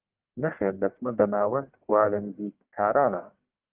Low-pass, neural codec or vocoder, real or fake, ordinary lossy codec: 3.6 kHz; codec, 44.1 kHz, 1.7 kbps, Pupu-Codec; fake; Opus, 32 kbps